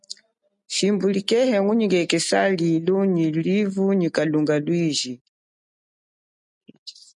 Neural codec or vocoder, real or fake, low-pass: none; real; 10.8 kHz